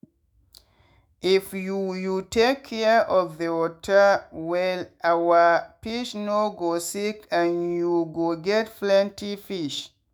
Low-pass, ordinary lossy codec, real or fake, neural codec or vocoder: none; none; fake; autoencoder, 48 kHz, 128 numbers a frame, DAC-VAE, trained on Japanese speech